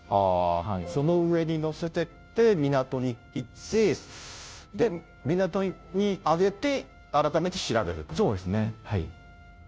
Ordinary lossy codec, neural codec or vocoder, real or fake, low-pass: none; codec, 16 kHz, 0.5 kbps, FunCodec, trained on Chinese and English, 25 frames a second; fake; none